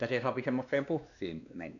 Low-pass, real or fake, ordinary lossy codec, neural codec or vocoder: 7.2 kHz; fake; none; codec, 16 kHz, 2 kbps, X-Codec, WavLM features, trained on Multilingual LibriSpeech